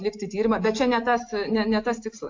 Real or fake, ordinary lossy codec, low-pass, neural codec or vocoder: real; AAC, 48 kbps; 7.2 kHz; none